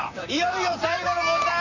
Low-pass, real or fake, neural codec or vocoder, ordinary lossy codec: 7.2 kHz; fake; vocoder, 44.1 kHz, 128 mel bands, Pupu-Vocoder; none